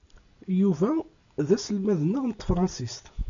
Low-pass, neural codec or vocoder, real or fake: 7.2 kHz; none; real